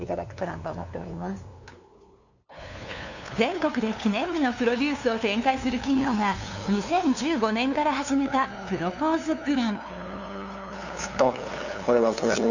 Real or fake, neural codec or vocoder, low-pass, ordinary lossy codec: fake; codec, 16 kHz, 2 kbps, FunCodec, trained on LibriTTS, 25 frames a second; 7.2 kHz; none